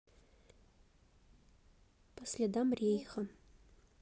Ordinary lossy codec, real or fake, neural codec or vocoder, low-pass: none; real; none; none